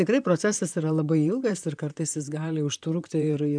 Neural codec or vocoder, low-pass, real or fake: vocoder, 44.1 kHz, 128 mel bands, Pupu-Vocoder; 9.9 kHz; fake